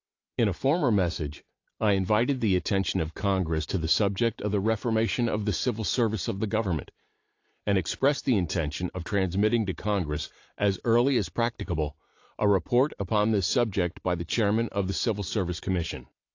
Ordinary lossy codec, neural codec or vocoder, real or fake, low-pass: AAC, 48 kbps; none; real; 7.2 kHz